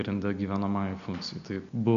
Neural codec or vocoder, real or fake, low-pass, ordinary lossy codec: none; real; 7.2 kHz; AAC, 96 kbps